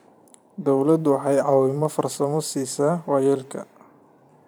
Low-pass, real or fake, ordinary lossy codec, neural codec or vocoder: none; fake; none; vocoder, 44.1 kHz, 128 mel bands every 512 samples, BigVGAN v2